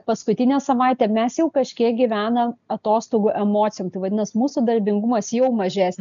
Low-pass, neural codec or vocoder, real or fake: 7.2 kHz; none; real